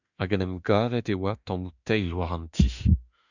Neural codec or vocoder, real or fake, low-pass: autoencoder, 48 kHz, 32 numbers a frame, DAC-VAE, trained on Japanese speech; fake; 7.2 kHz